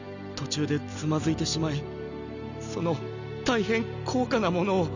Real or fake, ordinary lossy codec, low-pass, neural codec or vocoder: real; none; 7.2 kHz; none